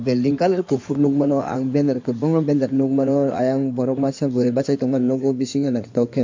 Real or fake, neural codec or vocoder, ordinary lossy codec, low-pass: fake; codec, 16 kHz in and 24 kHz out, 2.2 kbps, FireRedTTS-2 codec; MP3, 48 kbps; 7.2 kHz